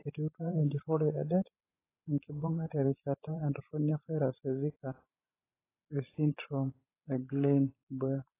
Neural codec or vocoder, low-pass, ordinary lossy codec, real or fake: vocoder, 44.1 kHz, 128 mel bands every 512 samples, BigVGAN v2; 3.6 kHz; AAC, 16 kbps; fake